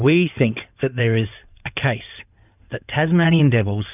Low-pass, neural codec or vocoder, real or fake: 3.6 kHz; codec, 16 kHz in and 24 kHz out, 2.2 kbps, FireRedTTS-2 codec; fake